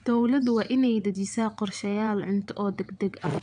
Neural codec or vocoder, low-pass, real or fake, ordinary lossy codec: vocoder, 22.05 kHz, 80 mel bands, WaveNeXt; 9.9 kHz; fake; none